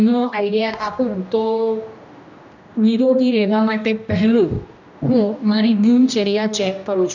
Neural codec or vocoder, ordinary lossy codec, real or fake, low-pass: codec, 16 kHz, 1 kbps, X-Codec, HuBERT features, trained on general audio; none; fake; 7.2 kHz